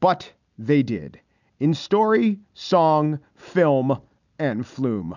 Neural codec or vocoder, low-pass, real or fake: none; 7.2 kHz; real